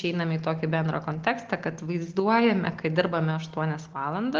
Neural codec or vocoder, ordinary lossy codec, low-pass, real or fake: none; Opus, 16 kbps; 7.2 kHz; real